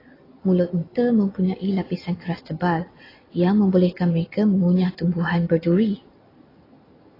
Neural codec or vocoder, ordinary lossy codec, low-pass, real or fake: none; AAC, 24 kbps; 5.4 kHz; real